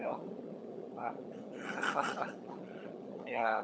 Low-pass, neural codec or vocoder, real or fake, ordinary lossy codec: none; codec, 16 kHz, 16 kbps, FunCodec, trained on LibriTTS, 50 frames a second; fake; none